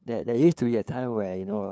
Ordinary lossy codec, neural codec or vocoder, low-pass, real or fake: none; codec, 16 kHz, 2 kbps, FunCodec, trained on LibriTTS, 25 frames a second; none; fake